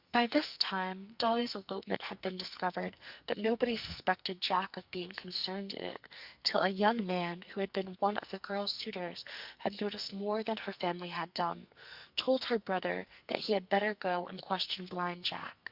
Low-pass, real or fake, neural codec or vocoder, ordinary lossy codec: 5.4 kHz; fake; codec, 44.1 kHz, 2.6 kbps, SNAC; Opus, 64 kbps